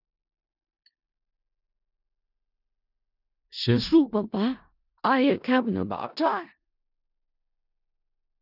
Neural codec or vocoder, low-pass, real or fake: codec, 16 kHz in and 24 kHz out, 0.4 kbps, LongCat-Audio-Codec, four codebook decoder; 5.4 kHz; fake